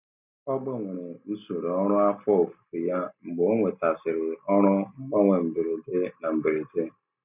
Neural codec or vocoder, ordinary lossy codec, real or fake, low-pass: none; none; real; 3.6 kHz